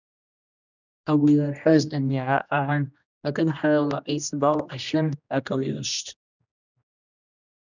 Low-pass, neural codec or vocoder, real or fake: 7.2 kHz; codec, 16 kHz, 1 kbps, X-Codec, HuBERT features, trained on general audio; fake